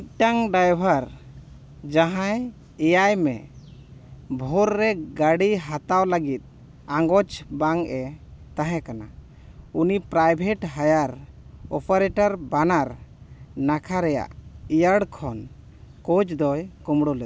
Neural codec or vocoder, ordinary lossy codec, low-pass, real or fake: none; none; none; real